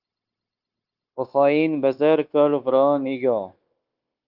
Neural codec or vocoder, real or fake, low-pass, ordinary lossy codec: codec, 16 kHz, 0.9 kbps, LongCat-Audio-Codec; fake; 5.4 kHz; Opus, 24 kbps